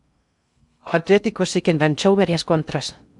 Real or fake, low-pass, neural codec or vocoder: fake; 10.8 kHz; codec, 16 kHz in and 24 kHz out, 0.6 kbps, FocalCodec, streaming, 2048 codes